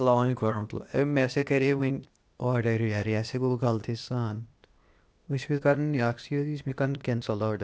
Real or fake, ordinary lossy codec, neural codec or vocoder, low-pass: fake; none; codec, 16 kHz, 0.8 kbps, ZipCodec; none